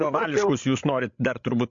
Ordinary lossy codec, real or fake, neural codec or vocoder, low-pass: MP3, 48 kbps; fake; codec, 16 kHz, 16 kbps, FreqCodec, larger model; 7.2 kHz